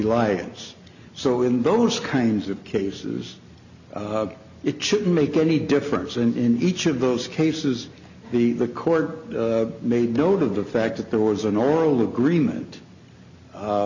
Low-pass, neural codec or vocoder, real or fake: 7.2 kHz; none; real